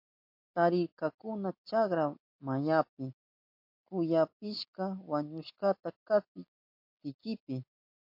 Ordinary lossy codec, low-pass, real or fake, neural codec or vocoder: MP3, 32 kbps; 5.4 kHz; real; none